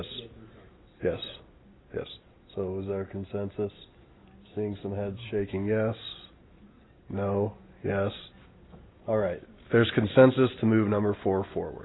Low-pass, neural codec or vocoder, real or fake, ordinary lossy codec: 7.2 kHz; none; real; AAC, 16 kbps